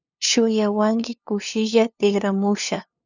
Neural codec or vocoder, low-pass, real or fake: codec, 16 kHz, 2 kbps, FunCodec, trained on LibriTTS, 25 frames a second; 7.2 kHz; fake